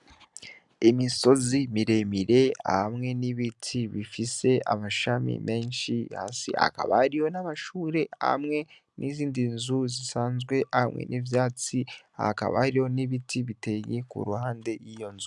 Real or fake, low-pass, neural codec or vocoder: real; 10.8 kHz; none